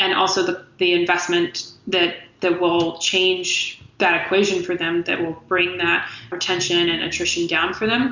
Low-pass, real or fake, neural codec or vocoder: 7.2 kHz; real; none